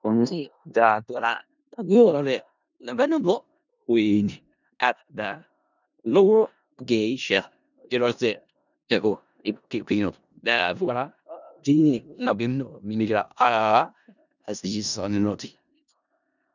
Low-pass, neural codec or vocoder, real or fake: 7.2 kHz; codec, 16 kHz in and 24 kHz out, 0.4 kbps, LongCat-Audio-Codec, four codebook decoder; fake